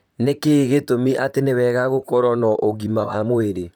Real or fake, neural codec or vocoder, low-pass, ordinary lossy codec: fake; vocoder, 44.1 kHz, 128 mel bands, Pupu-Vocoder; none; none